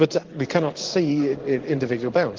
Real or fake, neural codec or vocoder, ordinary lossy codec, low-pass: fake; codec, 16 kHz in and 24 kHz out, 1 kbps, XY-Tokenizer; Opus, 16 kbps; 7.2 kHz